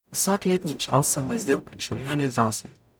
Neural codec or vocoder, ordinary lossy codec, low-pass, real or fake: codec, 44.1 kHz, 0.9 kbps, DAC; none; none; fake